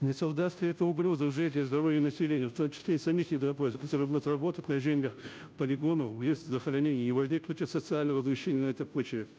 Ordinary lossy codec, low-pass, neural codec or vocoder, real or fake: none; none; codec, 16 kHz, 0.5 kbps, FunCodec, trained on Chinese and English, 25 frames a second; fake